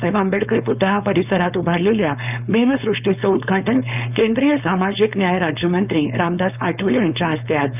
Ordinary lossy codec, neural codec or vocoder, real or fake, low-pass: none; codec, 16 kHz, 4.8 kbps, FACodec; fake; 3.6 kHz